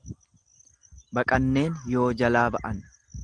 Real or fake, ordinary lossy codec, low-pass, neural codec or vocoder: real; Opus, 24 kbps; 10.8 kHz; none